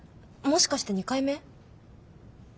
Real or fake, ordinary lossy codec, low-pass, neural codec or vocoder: real; none; none; none